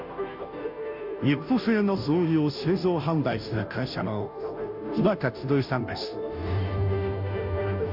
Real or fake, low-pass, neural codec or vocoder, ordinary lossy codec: fake; 5.4 kHz; codec, 16 kHz, 0.5 kbps, FunCodec, trained on Chinese and English, 25 frames a second; AAC, 48 kbps